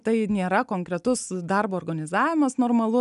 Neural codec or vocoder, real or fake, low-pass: none; real; 10.8 kHz